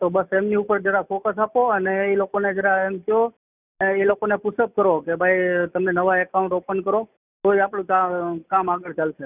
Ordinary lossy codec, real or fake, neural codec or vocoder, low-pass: none; real; none; 3.6 kHz